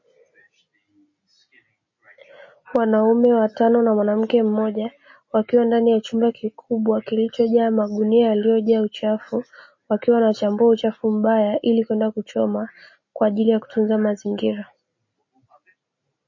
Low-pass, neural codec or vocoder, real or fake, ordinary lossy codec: 7.2 kHz; none; real; MP3, 32 kbps